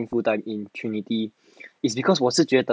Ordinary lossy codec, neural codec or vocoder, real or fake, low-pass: none; none; real; none